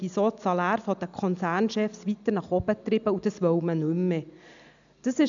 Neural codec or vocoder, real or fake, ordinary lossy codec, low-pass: none; real; none; 7.2 kHz